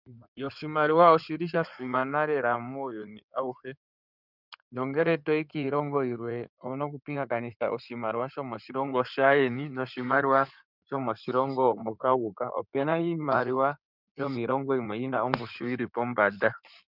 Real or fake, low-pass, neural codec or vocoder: fake; 5.4 kHz; codec, 16 kHz in and 24 kHz out, 2.2 kbps, FireRedTTS-2 codec